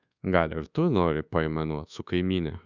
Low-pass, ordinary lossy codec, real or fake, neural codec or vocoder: 7.2 kHz; Opus, 64 kbps; fake; codec, 24 kHz, 1.2 kbps, DualCodec